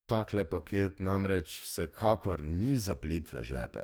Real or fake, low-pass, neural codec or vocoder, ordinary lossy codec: fake; none; codec, 44.1 kHz, 2.6 kbps, DAC; none